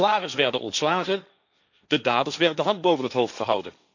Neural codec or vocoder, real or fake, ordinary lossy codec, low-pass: codec, 16 kHz, 1.1 kbps, Voila-Tokenizer; fake; none; 7.2 kHz